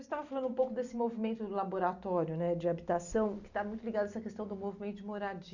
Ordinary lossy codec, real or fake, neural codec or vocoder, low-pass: none; real; none; 7.2 kHz